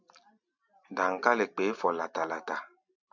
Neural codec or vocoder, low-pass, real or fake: none; 7.2 kHz; real